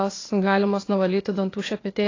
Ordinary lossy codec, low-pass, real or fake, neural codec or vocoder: AAC, 32 kbps; 7.2 kHz; fake; codec, 16 kHz, 0.8 kbps, ZipCodec